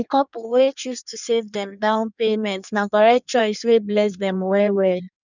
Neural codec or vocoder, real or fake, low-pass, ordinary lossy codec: codec, 16 kHz in and 24 kHz out, 1.1 kbps, FireRedTTS-2 codec; fake; 7.2 kHz; none